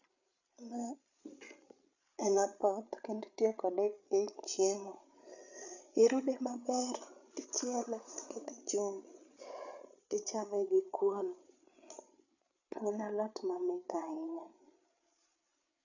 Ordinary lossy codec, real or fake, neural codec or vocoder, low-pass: none; fake; codec, 16 kHz, 8 kbps, FreqCodec, larger model; 7.2 kHz